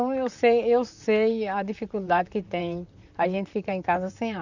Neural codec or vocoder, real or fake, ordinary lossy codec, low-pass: vocoder, 44.1 kHz, 128 mel bands, Pupu-Vocoder; fake; none; 7.2 kHz